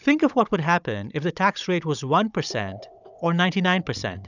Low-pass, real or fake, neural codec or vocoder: 7.2 kHz; fake; codec, 16 kHz, 16 kbps, FunCodec, trained on Chinese and English, 50 frames a second